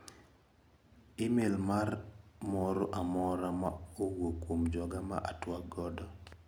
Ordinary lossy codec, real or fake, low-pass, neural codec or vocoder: none; real; none; none